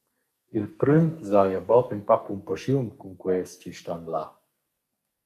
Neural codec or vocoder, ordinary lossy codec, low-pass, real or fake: codec, 44.1 kHz, 2.6 kbps, SNAC; MP3, 96 kbps; 14.4 kHz; fake